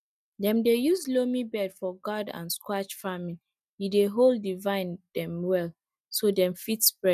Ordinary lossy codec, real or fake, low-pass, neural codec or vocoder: none; real; 14.4 kHz; none